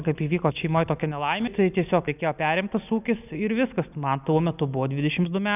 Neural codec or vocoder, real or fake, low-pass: none; real; 3.6 kHz